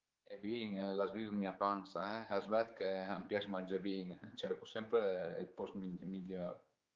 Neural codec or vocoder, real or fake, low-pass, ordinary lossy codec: codec, 16 kHz, 4 kbps, X-Codec, HuBERT features, trained on general audio; fake; 7.2 kHz; Opus, 32 kbps